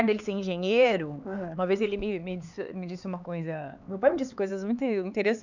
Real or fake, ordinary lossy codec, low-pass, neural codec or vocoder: fake; none; 7.2 kHz; codec, 16 kHz, 4 kbps, X-Codec, HuBERT features, trained on LibriSpeech